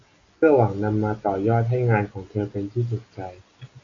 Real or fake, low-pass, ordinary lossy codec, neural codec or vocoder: real; 7.2 kHz; AAC, 32 kbps; none